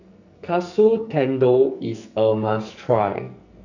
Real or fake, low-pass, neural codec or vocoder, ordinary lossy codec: fake; 7.2 kHz; codec, 44.1 kHz, 2.6 kbps, SNAC; none